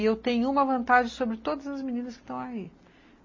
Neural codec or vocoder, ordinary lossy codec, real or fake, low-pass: none; MP3, 32 kbps; real; 7.2 kHz